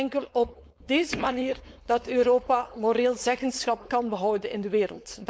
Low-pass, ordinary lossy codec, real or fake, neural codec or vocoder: none; none; fake; codec, 16 kHz, 4.8 kbps, FACodec